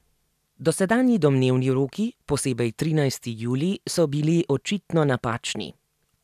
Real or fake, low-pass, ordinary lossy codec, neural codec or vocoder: real; 14.4 kHz; none; none